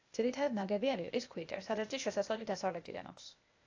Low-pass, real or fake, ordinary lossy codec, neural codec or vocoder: 7.2 kHz; fake; AAC, 48 kbps; codec, 16 kHz, 0.8 kbps, ZipCodec